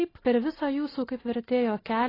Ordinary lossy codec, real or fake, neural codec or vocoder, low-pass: AAC, 24 kbps; real; none; 5.4 kHz